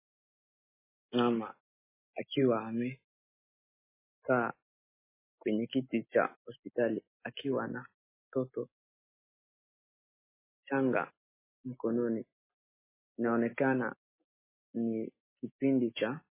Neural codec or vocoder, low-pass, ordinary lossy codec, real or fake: none; 3.6 kHz; MP3, 16 kbps; real